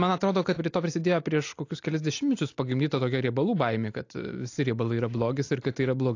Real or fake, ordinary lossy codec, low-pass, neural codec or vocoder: real; AAC, 48 kbps; 7.2 kHz; none